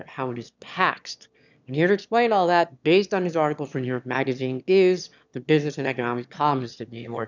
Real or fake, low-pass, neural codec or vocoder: fake; 7.2 kHz; autoencoder, 22.05 kHz, a latent of 192 numbers a frame, VITS, trained on one speaker